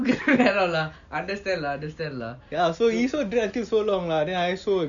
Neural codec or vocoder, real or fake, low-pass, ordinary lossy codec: none; real; 7.2 kHz; none